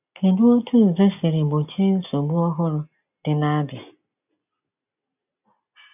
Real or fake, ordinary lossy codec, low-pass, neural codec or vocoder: real; none; 3.6 kHz; none